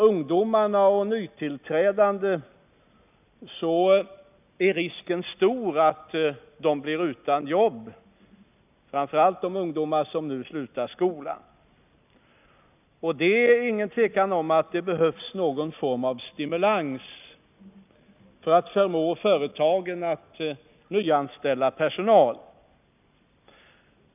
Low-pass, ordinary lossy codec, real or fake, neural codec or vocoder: 3.6 kHz; none; real; none